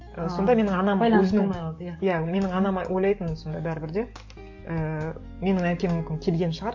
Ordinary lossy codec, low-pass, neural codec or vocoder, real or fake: MP3, 48 kbps; 7.2 kHz; codec, 44.1 kHz, 7.8 kbps, DAC; fake